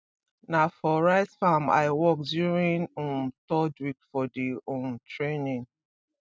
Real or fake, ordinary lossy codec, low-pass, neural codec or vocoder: real; none; none; none